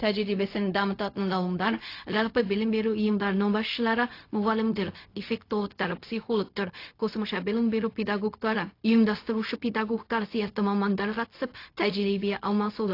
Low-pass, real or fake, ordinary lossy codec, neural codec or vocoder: 5.4 kHz; fake; AAC, 32 kbps; codec, 16 kHz, 0.4 kbps, LongCat-Audio-Codec